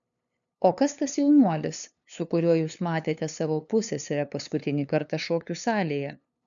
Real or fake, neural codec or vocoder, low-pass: fake; codec, 16 kHz, 2 kbps, FunCodec, trained on LibriTTS, 25 frames a second; 7.2 kHz